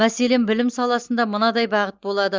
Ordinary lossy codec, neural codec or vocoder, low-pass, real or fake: Opus, 32 kbps; none; 7.2 kHz; real